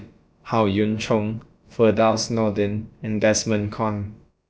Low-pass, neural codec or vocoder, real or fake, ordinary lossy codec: none; codec, 16 kHz, about 1 kbps, DyCAST, with the encoder's durations; fake; none